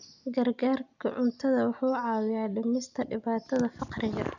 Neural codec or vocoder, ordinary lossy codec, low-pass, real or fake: none; none; 7.2 kHz; real